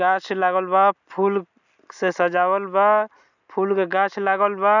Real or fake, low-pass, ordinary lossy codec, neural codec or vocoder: real; 7.2 kHz; none; none